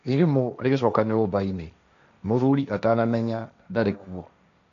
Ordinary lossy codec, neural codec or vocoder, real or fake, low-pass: none; codec, 16 kHz, 1.1 kbps, Voila-Tokenizer; fake; 7.2 kHz